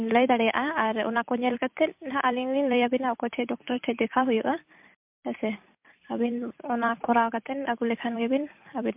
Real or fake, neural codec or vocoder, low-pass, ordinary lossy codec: fake; vocoder, 44.1 kHz, 128 mel bands every 256 samples, BigVGAN v2; 3.6 kHz; MP3, 32 kbps